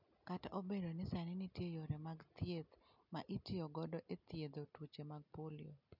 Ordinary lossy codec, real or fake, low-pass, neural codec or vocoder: none; real; 5.4 kHz; none